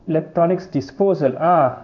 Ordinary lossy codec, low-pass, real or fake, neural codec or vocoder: none; 7.2 kHz; fake; codec, 16 kHz in and 24 kHz out, 1 kbps, XY-Tokenizer